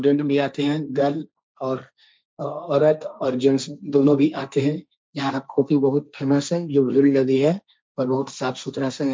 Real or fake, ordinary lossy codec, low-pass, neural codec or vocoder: fake; none; none; codec, 16 kHz, 1.1 kbps, Voila-Tokenizer